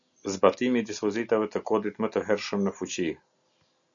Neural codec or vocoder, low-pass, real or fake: none; 7.2 kHz; real